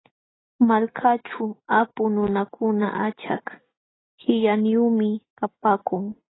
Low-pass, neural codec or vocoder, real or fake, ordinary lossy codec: 7.2 kHz; none; real; AAC, 16 kbps